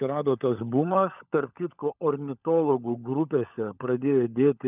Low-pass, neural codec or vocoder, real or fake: 3.6 kHz; codec, 16 kHz, 16 kbps, FunCodec, trained on LibriTTS, 50 frames a second; fake